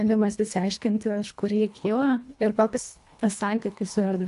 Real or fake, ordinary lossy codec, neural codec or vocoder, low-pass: fake; AAC, 64 kbps; codec, 24 kHz, 1.5 kbps, HILCodec; 10.8 kHz